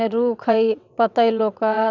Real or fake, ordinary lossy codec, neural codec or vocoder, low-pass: fake; none; vocoder, 22.05 kHz, 80 mel bands, WaveNeXt; 7.2 kHz